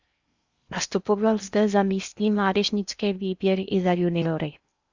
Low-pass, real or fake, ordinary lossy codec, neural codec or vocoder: 7.2 kHz; fake; Opus, 64 kbps; codec, 16 kHz in and 24 kHz out, 0.8 kbps, FocalCodec, streaming, 65536 codes